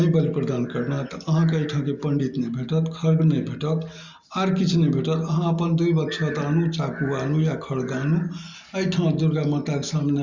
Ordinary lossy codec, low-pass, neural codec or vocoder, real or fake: Opus, 64 kbps; 7.2 kHz; none; real